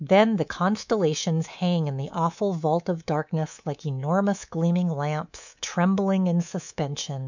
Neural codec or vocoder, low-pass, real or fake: codec, 24 kHz, 3.1 kbps, DualCodec; 7.2 kHz; fake